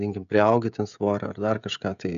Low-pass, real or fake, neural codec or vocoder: 7.2 kHz; fake; codec, 16 kHz, 16 kbps, FreqCodec, smaller model